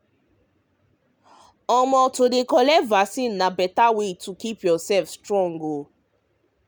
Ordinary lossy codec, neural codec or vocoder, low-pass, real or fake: none; none; none; real